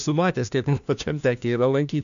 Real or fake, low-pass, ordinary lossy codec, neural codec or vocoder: fake; 7.2 kHz; MP3, 96 kbps; codec, 16 kHz, 1 kbps, FunCodec, trained on LibriTTS, 50 frames a second